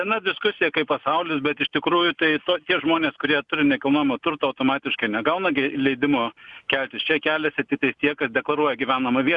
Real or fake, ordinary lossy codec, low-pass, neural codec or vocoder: real; Opus, 64 kbps; 10.8 kHz; none